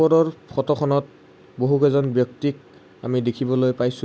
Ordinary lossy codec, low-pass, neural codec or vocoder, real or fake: none; none; none; real